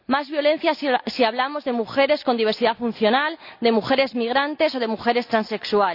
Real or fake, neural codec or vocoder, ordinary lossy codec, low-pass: real; none; none; 5.4 kHz